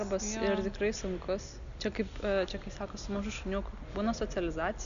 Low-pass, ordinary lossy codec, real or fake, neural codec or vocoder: 7.2 kHz; MP3, 96 kbps; real; none